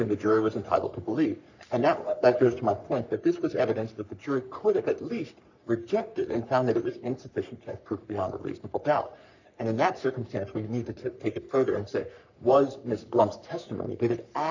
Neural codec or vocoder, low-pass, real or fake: codec, 44.1 kHz, 3.4 kbps, Pupu-Codec; 7.2 kHz; fake